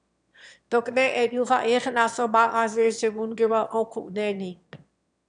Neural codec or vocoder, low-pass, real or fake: autoencoder, 22.05 kHz, a latent of 192 numbers a frame, VITS, trained on one speaker; 9.9 kHz; fake